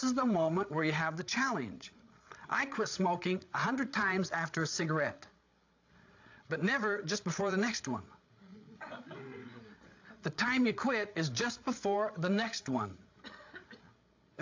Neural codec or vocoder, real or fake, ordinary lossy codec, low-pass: codec, 16 kHz, 8 kbps, FreqCodec, larger model; fake; AAC, 48 kbps; 7.2 kHz